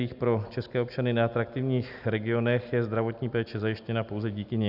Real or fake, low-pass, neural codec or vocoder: real; 5.4 kHz; none